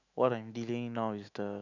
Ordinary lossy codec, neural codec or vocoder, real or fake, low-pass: none; autoencoder, 48 kHz, 128 numbers a frame, DAC-VAE, trained on Japanese speech; fake; 7.2 kHz